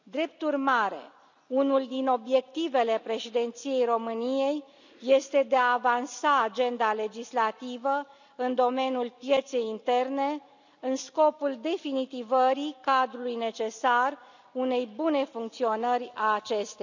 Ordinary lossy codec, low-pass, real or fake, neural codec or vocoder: none; 7.2 kHz; real; none